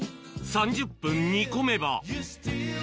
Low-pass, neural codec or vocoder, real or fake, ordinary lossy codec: none; none; real; none